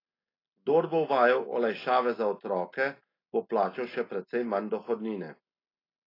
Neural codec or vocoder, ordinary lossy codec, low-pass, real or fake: none; AAC, 24 kbps; 5.4 kHz; real